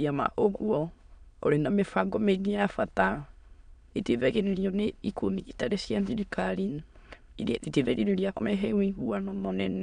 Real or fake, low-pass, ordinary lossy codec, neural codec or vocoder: fake; 9.9 kHz; none; autoencoder, 22.05 kHz, a latent of 192 numbers a frame, VITS, trained on many speakers